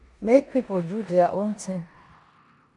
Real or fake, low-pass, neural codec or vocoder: fake; 10.8 kHz; codec, 16 kHz in and 24 kHz out, 0.9 kbps, LongCat-Audio-Codec, four codebook decoder